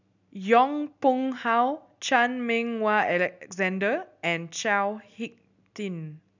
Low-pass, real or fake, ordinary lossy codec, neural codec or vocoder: 7.2 kHz; real; none; none